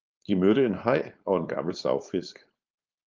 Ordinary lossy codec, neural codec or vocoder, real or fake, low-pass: Opus, 24 kbps; codec, 16 kHz, 4.8 kbps, FACodec; fake; 7.2 kHz